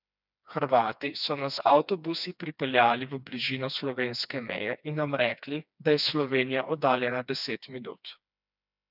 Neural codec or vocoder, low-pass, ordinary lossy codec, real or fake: codec, 16 kHz, 2 kbps, FreqCodec, smaller model; 5.4 kHz; none; fake